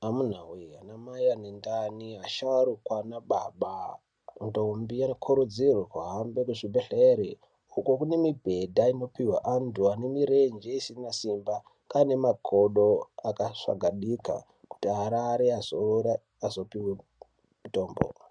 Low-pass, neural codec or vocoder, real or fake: 9.9 kHz; none; real